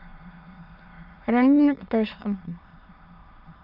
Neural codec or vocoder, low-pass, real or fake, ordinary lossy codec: autoencoder, 22.05 kHz, a latent of 192 numbers a frame, VITS, trained on many speakers; 5.4 kHz; fake; none